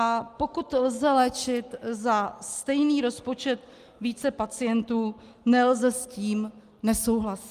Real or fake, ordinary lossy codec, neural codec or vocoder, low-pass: real; Opus, 32 kbps; none; 14.4 kHz